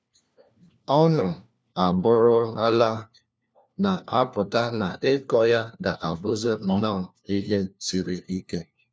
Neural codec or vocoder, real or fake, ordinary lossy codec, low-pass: codec, 16 kHz, 1 kbps, FunCodec, trained on LibriTTS, 50 frames a second; fake; none; none